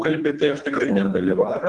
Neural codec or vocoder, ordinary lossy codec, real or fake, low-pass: codec, 24 kHz, 1.5 kbps, HILCodec; Opus, 32 kbps; fake; 10.8 kHz